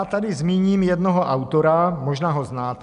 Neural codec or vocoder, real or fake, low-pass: none; real; 10.8 kHz